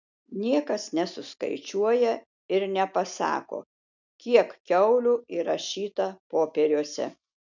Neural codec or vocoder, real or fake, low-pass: none; real; 7.2 kHz